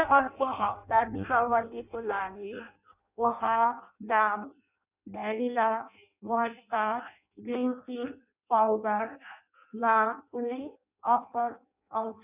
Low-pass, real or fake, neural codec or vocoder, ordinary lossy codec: 3.6 kHz; fake; codec, 16 kHz in and 24 kHz out, 0.6 kbps, FireRedTTS-2 codec; none